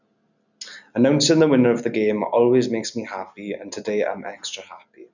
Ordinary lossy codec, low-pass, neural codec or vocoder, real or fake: none; 7.2 kHz; none; real